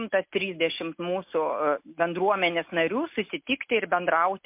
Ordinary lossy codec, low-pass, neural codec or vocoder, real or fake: MP3, 32 kbps; 3.6 kHz; none; real